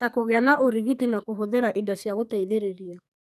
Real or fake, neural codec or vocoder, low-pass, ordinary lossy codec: fake; codec, 44.1 kHz, 2.6 kbps, SNAC; 14.4 kHz; none